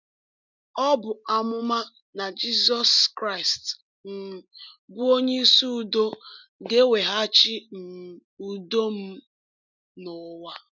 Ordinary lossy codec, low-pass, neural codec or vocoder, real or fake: none; 7.2 kHz; none; real